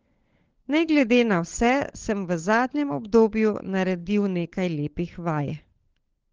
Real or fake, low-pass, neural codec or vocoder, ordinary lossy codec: fake; 7.2 kHz; codec, 16 kHz, 16 kbps, FunCodec, trained on LibriTTS, 50 frames a second; Opus, 16 kbps